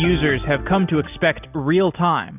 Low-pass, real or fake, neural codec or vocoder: 3.6 kHz; real; none